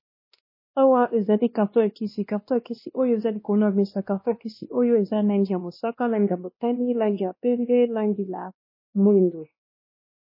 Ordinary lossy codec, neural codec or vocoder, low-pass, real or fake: MP3, 24 kbps; codec, 16 kHz, 1 kbps, X-Codec, WavLM features, trained on Multilingual LibriSpeech; 5.4 kHz; fake